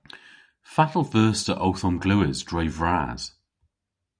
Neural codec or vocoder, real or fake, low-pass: none; real; 9.9 kHz